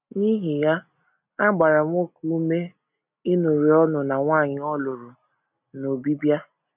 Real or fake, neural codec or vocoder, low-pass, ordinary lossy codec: real; none; 3.6 kHz; none